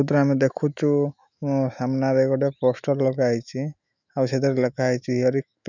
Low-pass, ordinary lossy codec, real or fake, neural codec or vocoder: 7.2 kHz; none; fake; autoencoder, 48 kHz, 128 numbers a frame, DAC-VAE, trained on Japanese speech